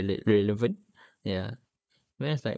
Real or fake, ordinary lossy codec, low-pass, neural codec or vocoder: fake; none; none; codec, 16 kHz, 4 kbps, FunCodec, trained on Chinese and English, 50 frames a second